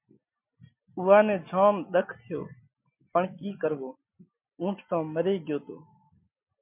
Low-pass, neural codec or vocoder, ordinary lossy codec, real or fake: 3.6 kHz; none; MP3, 32 kbps; real